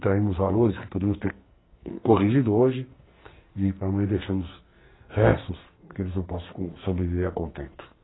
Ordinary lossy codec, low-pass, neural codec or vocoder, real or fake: AAC, 16 kbps; 7.2 kHz; codec, 44.1 kHz, 2.6 kbps, SNAC; fake